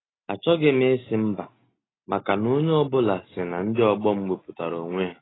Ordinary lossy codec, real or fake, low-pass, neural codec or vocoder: AAC, 16 kbps; real; 7.2 kHz; none